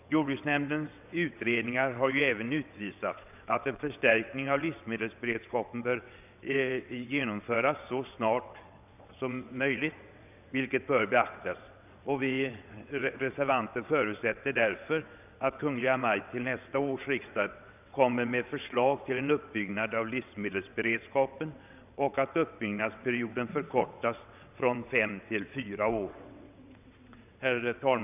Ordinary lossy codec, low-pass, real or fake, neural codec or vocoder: none; 3.6 kHz; fake; vocoder, 22.05 kHz, 80 mel bands, WaveNeXt